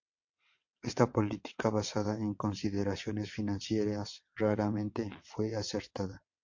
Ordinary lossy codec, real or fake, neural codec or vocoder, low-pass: MP3, 48 kbps; fake; vocoder, 22.05 kHz, 80 mel bands, WaveNeXt; 7.2 kHz